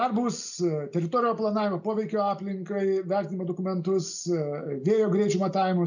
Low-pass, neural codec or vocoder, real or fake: 7.2 kHz; none; real